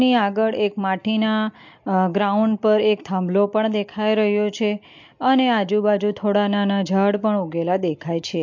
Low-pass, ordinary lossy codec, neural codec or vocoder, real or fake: 7.2 kHz; MP3, 48 kbps; none; real